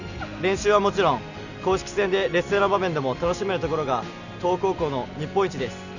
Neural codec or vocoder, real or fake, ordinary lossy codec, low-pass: none; real; none; 7.2 kHz